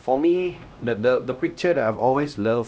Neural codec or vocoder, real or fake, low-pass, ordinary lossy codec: codec, 16 kHz, 1 kbps, X-Codec, HuBERT features, trained on LibriSpeech; fake; none; none